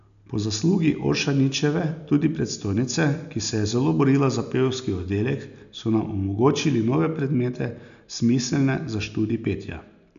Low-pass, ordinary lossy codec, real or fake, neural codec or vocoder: 7.2 kHz; none; real; none